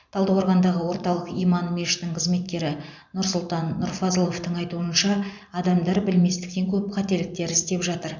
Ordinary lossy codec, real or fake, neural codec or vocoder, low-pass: none; fake; vocoder, 44.1 kHz, 128 mel bands every 512 samples, BigVGAN v2; 7.2 kHz